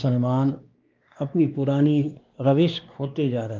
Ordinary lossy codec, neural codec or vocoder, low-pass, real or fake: Opus, 32 kbps; codec, 16 kHz, 2 kbps, X-Codec, WavLM features, trained on Multilingual LibriSpeech; 7.2 kHz; fake